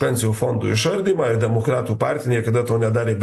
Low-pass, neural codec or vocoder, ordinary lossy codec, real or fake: 14.4 kHz; none; AAC, 64 kbps; real